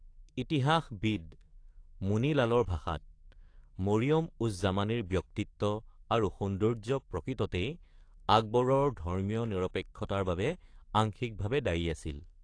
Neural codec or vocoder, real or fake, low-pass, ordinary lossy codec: codec, 44.1 kHz, 7.8 kbps, DAC; fake; 9.9 kHz; AAC, 48 kbps